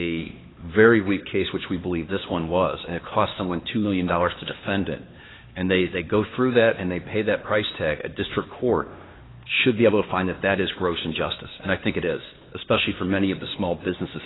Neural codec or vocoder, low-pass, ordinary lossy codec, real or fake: codec, 16 kHz, 2 kbps, X-Codec, HuBERT features, trained on LibriSpeech; 7.2 kHz; AAC, 16 kbps; fake